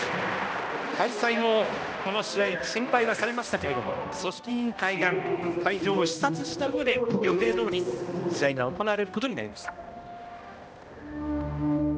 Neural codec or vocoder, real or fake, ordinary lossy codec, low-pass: codec, 16 kHz, 1 kbps, X-Codec, HuBERT features, trained on balanced general audio; fake; none; none